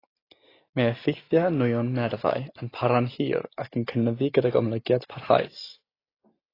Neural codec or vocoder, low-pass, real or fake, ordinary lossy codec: none; 5.4 kHz; real; AAC, 24 kbps